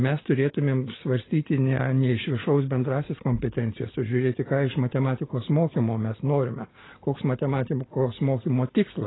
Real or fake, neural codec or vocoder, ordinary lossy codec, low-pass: real; none; AAC, 16 kbps; 7.2 kHz